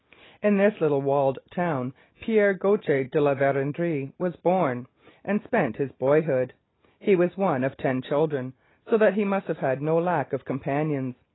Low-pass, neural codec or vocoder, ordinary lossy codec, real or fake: 7.2 kHz; none; AAC, 16 kbps; real